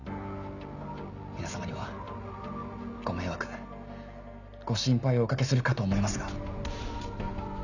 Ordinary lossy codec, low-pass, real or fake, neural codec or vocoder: none; 7.2 kHz; real; none